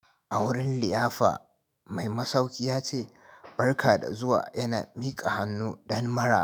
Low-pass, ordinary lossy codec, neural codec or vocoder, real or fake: none; none; autoencoder, 48 kHz, 128 numbers a frame, DAC-VAE, trained on Japanese speech; fake